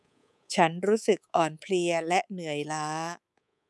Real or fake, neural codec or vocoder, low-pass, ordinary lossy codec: fake; codec, 24 kHz, 3.1 kbps, DualCodec; none; none